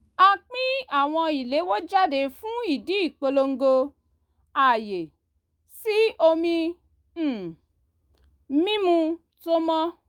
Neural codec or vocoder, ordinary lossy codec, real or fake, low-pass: autoencoder, 48 kHz, 128 numbers a frame, DAC-VAE, trained on Japanese speech; Opus, 32 kbps; fake; 19.8 kHz